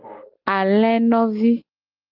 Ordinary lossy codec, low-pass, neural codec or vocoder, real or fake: Opus, 32 kbps; 5.4 kHz; none; real